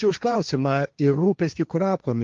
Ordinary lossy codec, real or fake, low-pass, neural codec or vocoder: Opus, 32 kbps; fake; 7.2 kHz; codec, 16 kHz, 1.1 kbps, Voila-Tokenizer